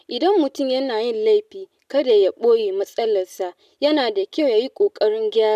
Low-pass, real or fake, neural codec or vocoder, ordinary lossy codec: 14.4 kHz; real; none; none